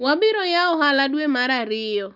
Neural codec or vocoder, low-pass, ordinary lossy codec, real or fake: none; 5.4 kHz; none; real